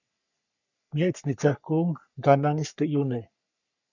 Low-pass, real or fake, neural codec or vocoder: 7.2 kHz; fake; codec, 44.1 kHz, 3.4 kbps, Pupu-Codec